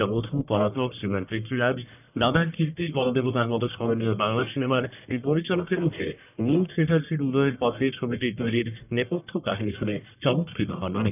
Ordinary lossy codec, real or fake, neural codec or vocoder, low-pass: none; fake; codec, 44.1 kHz, 1.7 kbps, Pupu-Codec; 3.6 kHz